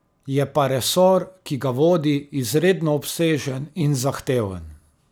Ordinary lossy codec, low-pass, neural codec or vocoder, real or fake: none; none; none; real